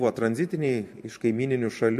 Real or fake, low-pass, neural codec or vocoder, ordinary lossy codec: fake; 14.4 kHz; vocoder, 44.1 kHz, 128 mel bands every 512 samples, BigVGAN v2; MP3, 64 kbps